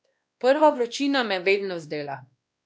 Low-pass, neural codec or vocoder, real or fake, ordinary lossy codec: none; codec, 16 kHz, 1 kbps, X-Codec, WavLM features, trained on Multilingual LibriSpeech; fake; none